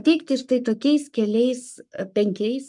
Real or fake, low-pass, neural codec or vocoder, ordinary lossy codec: fake; 10.8 kHz; codec, 44.1 kHz, 7.8 kbps, Pupu-Codec; MP3, 96 kbps